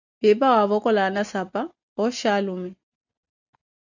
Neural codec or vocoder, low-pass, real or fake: none; 7.2 kHz; real